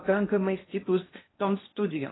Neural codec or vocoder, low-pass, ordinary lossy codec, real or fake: codec, 16 kHz in and 24 kHz out, 0.6 kbps, FocalCodec, streaming, 2048 codes; 7.2 kHz; AAC, 16 kbps; fake